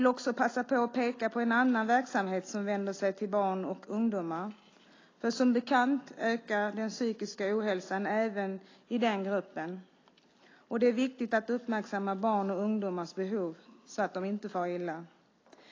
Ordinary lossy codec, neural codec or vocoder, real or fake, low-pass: AAC, 32 kbps; none; real; 7.2 kHz